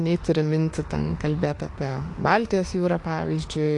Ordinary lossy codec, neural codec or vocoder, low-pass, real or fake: AAC, 48 kbps; autoencoder, 48 kHz, 32 numbers a frame, DAC-VAE, trained on Japanese speech; 10.8 kHz; fake